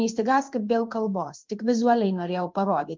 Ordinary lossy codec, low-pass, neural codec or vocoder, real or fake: Opus, 32 kbps; 7.2 kHz; codec, 16 kHz in and 24 kHz out, 1 kbps, XY-Tokenizer; fake